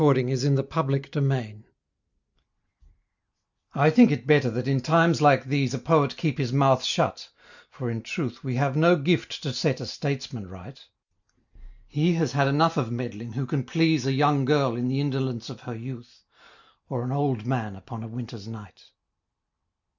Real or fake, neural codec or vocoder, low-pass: real; none; 7.2 kHz